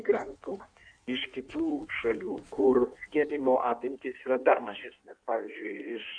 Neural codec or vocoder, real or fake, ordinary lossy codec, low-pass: codec, 16 kHz in and 24 kHz out, 1.1 kbps, FireRedTTS-2 codec; fake; MP3, 64 kbps; 9.9 kHz